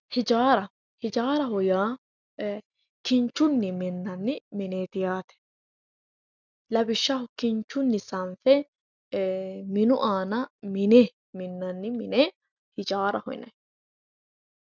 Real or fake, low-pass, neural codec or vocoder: real; 7.2 kHz; none